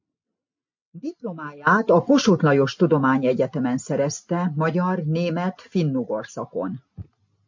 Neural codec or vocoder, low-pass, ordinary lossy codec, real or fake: none; 7.2 kHz; MP3, 48 kbps; real